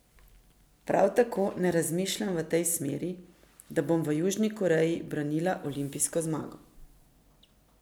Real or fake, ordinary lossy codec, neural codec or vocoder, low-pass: real; none; none; none